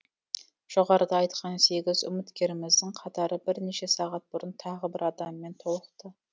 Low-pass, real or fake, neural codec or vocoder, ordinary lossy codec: none; real; none; none